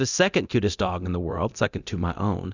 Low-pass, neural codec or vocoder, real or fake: 7.2 kHz; codec, 24 kHz, 0.9 kbps, DualCodec; fake